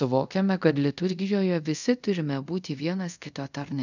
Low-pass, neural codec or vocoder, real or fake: 7.2 kHz; codec, 24 kHz, 0.5 kbps, DualCodec; fake